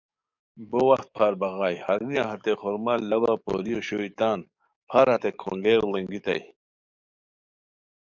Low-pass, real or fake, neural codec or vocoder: 7.2 kHz; fake; codec, 44.1 kHz, 7.8 kbps, DAC